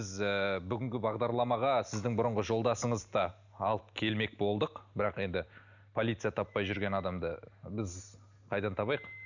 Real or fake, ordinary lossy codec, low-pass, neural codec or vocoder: real; none; 7.2 kHz; none